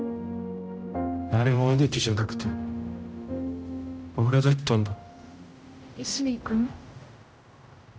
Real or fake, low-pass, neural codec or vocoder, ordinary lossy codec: fake; none; codec, 16 kHz, 0.5 kbps, X-Codec, HuBERT features, trained on general audio; none